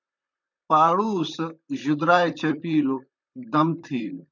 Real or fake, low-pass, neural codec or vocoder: fake; 7.2 kHz; vocoder, 44.1 kHz, 128 mel bands, Pupu-Vocoder